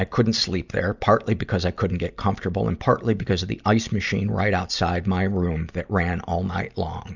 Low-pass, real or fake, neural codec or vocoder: 7.2 kHz; real; none